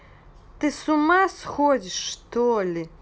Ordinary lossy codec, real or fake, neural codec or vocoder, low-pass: none; real; none; none